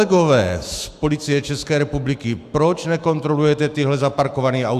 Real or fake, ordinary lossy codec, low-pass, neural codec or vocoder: fake; AAC, 96 kbps; 14.4 kHz; autoencoder, 48 kHz, 128 numbers a frame, DAC-VAE, trained on Japanese speech